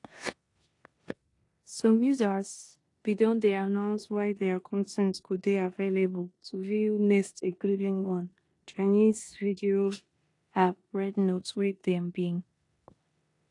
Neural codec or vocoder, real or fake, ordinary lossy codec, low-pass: codec, 16 kHz in and 24 kHz out, 0.9 kbps, LongCat-Audio-Codec, four codebook decoder; fake; AAC, 48 kbps; 10.8 kHz